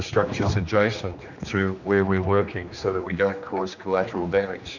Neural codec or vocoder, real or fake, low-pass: codec, 16 kHz, 2 kbps, X-Codec, HuBERT features, trained on general audio; fake; 7.2 kHz